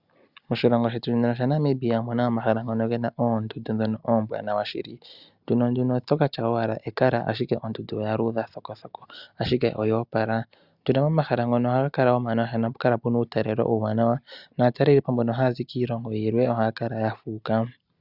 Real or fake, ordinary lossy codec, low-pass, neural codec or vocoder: real; Opus, 64 kbps; 5.4 kHz; none